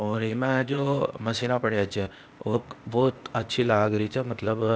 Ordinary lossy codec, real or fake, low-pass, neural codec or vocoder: none; fake; none; codec, 16 kHz, 0.8 kbps, ZipCodec